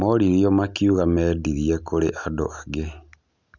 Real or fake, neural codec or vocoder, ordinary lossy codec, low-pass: real; none; none; 7.2 kHz